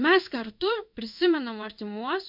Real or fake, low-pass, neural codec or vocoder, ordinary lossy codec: fake; 5.4 kHz; codec, 16 kHz in and 24 kHz out, 1 kbps, XY-Tokenizer; MP3, 48 kbps